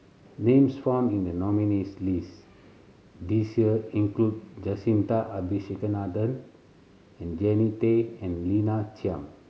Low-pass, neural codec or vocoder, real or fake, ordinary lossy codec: none; none; real; none